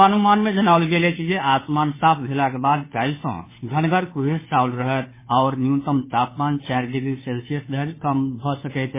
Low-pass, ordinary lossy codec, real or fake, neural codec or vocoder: 3.6 kHz; MP3, 16 kbps; fake; codec, 16 kHz, 2 kbps, FunCodec, trained on Chinese and English, 25 frames a second